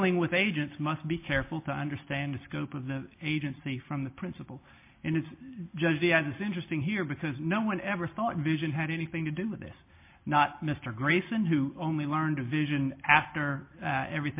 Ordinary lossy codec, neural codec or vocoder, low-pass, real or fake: MP3, 24 kbps; none; 3.6 kHz; real